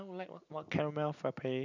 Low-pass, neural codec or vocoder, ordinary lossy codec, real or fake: 7.2 kHz; none; none; real